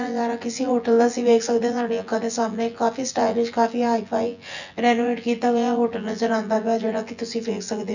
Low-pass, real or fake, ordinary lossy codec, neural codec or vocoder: 7.2 kHz; fake; none; vocoder, 24 kHz, 100 mel bands, Vocos